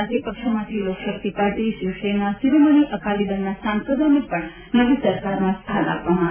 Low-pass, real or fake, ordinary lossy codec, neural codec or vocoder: 3.6 kHz; real; MP3, 32 kbps; none